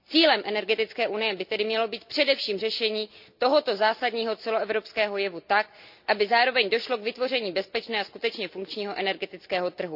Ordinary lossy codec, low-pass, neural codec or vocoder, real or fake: none; 5.4 kHz; none; real